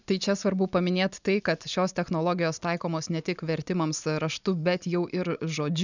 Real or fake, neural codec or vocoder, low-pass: real; none; 7.2 kHz